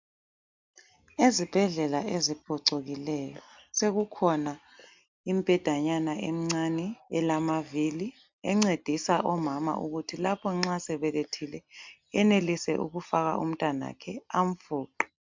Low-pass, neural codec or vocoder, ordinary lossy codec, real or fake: 7.2 kHz; none; MP3, 64 kbps; real